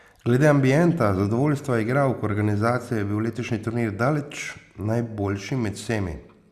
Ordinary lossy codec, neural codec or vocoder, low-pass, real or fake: Opus, 64 kbps; none; 14.4 kHz; real